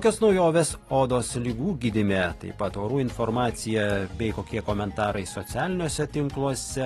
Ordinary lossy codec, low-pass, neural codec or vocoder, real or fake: AAC, 32 kbps; 19.8 kHz; none; real